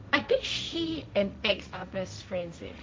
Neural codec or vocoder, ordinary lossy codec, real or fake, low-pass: codec, 16 kHz, 1.1 kbps, Voila-Tokenizer; none; fake; none